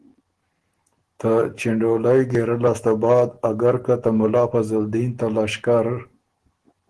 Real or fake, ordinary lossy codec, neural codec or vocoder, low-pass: real; Opus, 16 kbps; none; 10.8 kHz